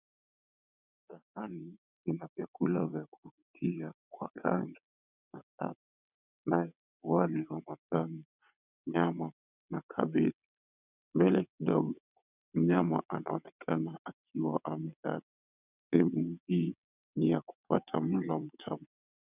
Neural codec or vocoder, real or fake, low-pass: vocoder, 22.05 kHz, 80 mel bands, WaveNeXt; fake; 3.6 kHz